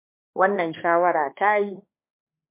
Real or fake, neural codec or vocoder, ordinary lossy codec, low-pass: fake; codec, 16 kHz, 2 kbps, X-Codec, HuBERT features, trained on balanced general audio; MP3, 24 kbps; 3.6 kHz